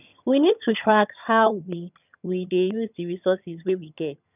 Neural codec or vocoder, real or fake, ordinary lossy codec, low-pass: vocoder, 22.05 kHz, 80 mel bands, HiFi-GAN; fake; none; 3.6 kHz